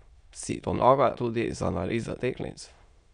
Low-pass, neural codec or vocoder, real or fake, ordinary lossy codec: 9.9 kHz; autoencoder, 22.05 kHz, a latent of 192 numbers a frame, VITS, trained on many speakers; fake; MP3, 96 kbps